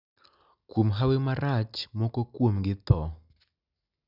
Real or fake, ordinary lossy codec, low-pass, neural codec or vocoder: real; none; 5.4 kHz; none